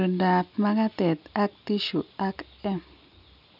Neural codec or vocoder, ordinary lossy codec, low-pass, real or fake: none; none; 5.4 kHz; real